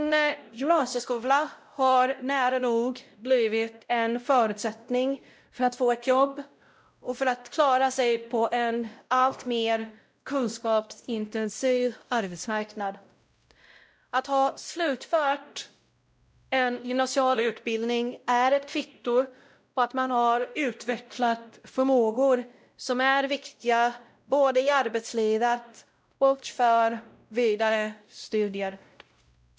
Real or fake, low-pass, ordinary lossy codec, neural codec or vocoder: fake; none; none; codec, 16 kHz, 0.5 kbps, X-Codec, WavLM features, trained on Multilingual LibriSpeech